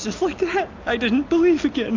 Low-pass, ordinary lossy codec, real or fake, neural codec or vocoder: 7.2 kHz; AAC, 48 kbps; real; none